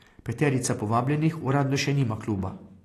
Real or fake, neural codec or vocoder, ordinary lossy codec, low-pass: real; none; AAC, 48 kbps; 14.4 kHz